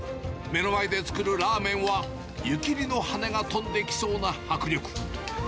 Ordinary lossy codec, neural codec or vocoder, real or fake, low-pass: none; none; real; none